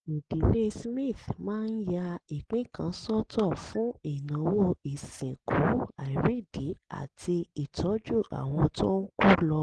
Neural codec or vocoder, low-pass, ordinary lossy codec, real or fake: none; 9.9 kHz; Opus, 16 kbps; real